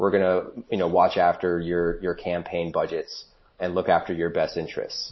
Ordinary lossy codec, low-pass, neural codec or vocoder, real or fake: MP3, 24 kbps; 7.2 kHz; none; real